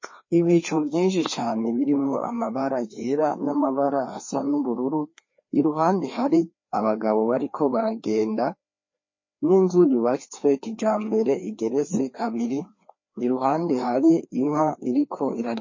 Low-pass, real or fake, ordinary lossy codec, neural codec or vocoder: 7.2 kHz; fake; MP3, 32 kbps; codec, 16 kHz, 2 kbps, FreqCodec, larger model